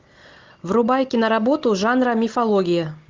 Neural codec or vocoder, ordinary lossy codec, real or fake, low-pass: none; Opus, 32 kbps; real; 7.2 kHz